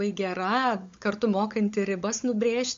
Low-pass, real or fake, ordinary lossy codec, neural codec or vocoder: 7.2 kHz; fake; MP3, 48 kbps; codec, 16 kHz, 16 kbps, FunCodec, trained on LibriTTS, 50 frames a second